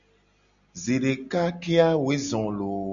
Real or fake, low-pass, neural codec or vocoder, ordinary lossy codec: real; 7.2 kHz; none; MP3, 96 kbps